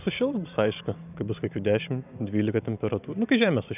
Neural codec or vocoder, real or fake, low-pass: vocoder, 44.1 kHz, 128 mel bands every 512 samples, BigVGAN v2; fake; 3.6 kHz